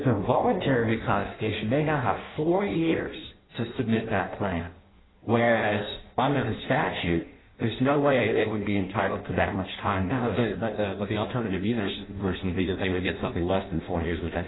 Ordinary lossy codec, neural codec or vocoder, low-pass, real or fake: AAC, 16 kbps; codec, 16 kHz in and 24 kHz out, 0.6 kbps, FireRedTTS-2 codec; 7.2 kHz; fake